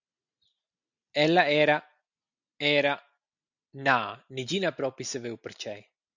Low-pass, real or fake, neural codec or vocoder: 7.2 kHz; real; none